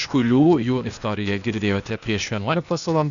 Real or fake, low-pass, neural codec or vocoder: fake; 7.2 kHz; codec, 16 kHz, 0.8 kbps, ZipCodec